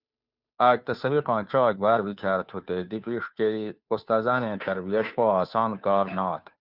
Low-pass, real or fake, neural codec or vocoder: 5.4 kHz; fake; codec, 16 kHz, 2 kbps, FunCodec, trained on Chinese and English, 25 frames a second